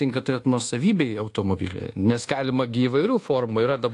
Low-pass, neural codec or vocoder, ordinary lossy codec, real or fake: 10.8 kHz; codec, 24 kHz, 1.2 kbps, DualCodec; AAC, 48 kbps; fake